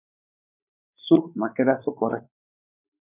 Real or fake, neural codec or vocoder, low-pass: fake; codec, 16 kHz, 4 kbps, X-Codec, WavLM features, trained on Multilingual LibriSpeech; 3.6 kHz